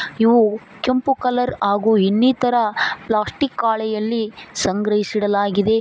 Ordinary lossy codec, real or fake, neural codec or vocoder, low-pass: none; real; none; none